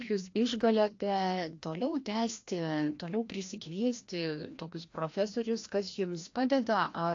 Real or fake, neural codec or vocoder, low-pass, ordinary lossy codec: fake; codec, 16 kHz, 1 kbps, FreqCodec, larger model; 7.2 kHz; AAC, 48 kbps